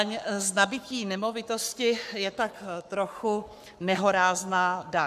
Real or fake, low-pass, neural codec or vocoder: fake; 14.4 kHz; codec, 44.1 kHz, 7.8 kbps, Pupu-Codec